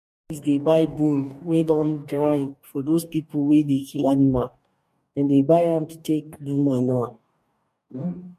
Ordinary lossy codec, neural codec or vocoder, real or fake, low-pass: MP3, 64 kbps; codec, 44.1 kHz, 2.6 kbps, DAC; fake; 14.4 kHz